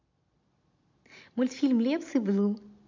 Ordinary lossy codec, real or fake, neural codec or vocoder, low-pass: MP3, 48 kbps; real; none; 7.2 kHz